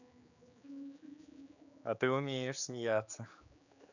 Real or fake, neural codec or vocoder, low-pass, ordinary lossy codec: fake; codec, 16 kHz, 4 kbps, X-Codec, HuBERT features, trained on general audio; 7.2 kHz; none